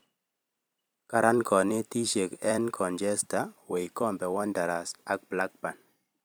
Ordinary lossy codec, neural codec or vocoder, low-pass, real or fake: none; vocoder, 44.1 kHz, 128 mel bands every 256 samples, BigVGAN v2; none; fake